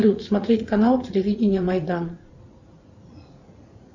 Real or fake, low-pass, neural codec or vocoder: fake; 7.2 kHz; vocoder, 44.1 kHz, 80 mel bands, Vocos